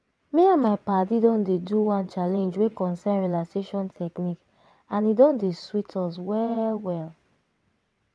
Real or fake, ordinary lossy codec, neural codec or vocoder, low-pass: fake; none; vocoder, 22.05 kHz, 80 mel bands, WaveNeXt; none